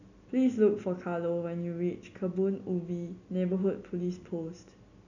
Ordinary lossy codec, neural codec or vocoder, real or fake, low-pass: none; none; real; 7.2 kHz